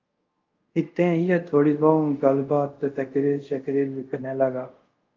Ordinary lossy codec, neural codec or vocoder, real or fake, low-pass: Opus, 32 kbps; codec, 24 kHz, 0.5 kbps, DualCodec; fake; 7.2 kHz